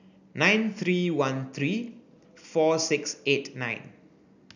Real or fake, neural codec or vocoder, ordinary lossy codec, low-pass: real; none; none; 7.2 kHz